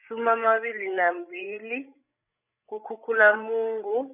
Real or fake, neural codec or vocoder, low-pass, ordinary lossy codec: fake; codec, 16 kHz, 8 kbps, FreqCodec, larger model; 3.6 kHz; none